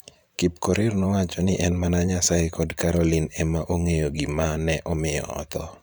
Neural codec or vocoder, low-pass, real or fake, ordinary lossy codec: vocoder, 44.1 kHz, 128 mel bands every 512 samples, BigVGAN v2; none; fake; none